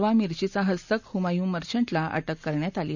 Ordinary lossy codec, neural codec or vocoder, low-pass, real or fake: none; none; 7.2 kHz; real